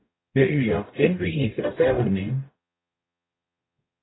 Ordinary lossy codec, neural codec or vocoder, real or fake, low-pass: AAC, 16 kbps; codec, 44.1 kHz, 0.9 kbps, DAC; fake; 7.2 kHz